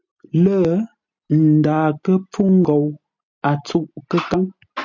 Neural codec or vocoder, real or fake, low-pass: none; real; 7.2 kHz